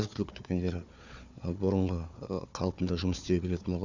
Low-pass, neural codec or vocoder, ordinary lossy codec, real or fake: 7.2 kHz; codec, 16 kHz, 4 kbps, FunCodec, trained on Chinese and English, 50 frames a second; none; fake